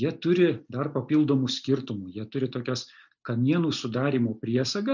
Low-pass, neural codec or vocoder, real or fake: 7.2 kHz; none; real